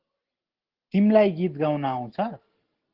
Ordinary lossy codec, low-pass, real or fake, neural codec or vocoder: Opus, 16 kbps; 5.4 kHz; real; none